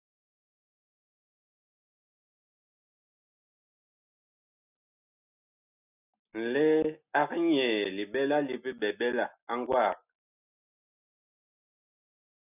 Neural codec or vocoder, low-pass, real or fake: none; 3.6 kHz; real